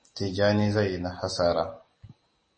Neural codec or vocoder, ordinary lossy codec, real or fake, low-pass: none; MP3, 32 kbps; real; 9.9 kHz